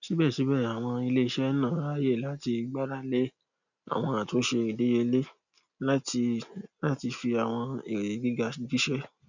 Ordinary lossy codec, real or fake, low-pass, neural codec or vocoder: none; real; 7.2 kHz; none